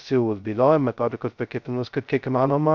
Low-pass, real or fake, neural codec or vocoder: 7.2 kHz; fake; codec, 16 kHz, 0.2 kbps, FocalCodec